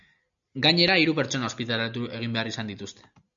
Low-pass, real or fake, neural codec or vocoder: 7.2 kHz; real; none